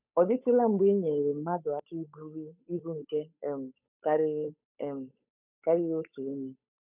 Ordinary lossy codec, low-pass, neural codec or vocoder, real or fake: none; 3.6 kHz; codec, 16 kHz, 8 kbps, FunCodec, trained on Chinese and English, 25 frames a second; fake